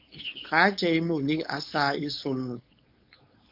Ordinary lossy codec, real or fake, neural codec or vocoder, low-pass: MP3, 48 kbps; fake; codec, 16 kHz, 4.8 kbps, FACodec; 5.4 kHz